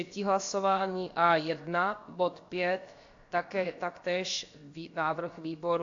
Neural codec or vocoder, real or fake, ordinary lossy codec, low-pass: codec, 16 kHz, about 1 kbps, DyCAST, with the encoder's durations; fake; AAC, 48 kbps; 7.2 kHz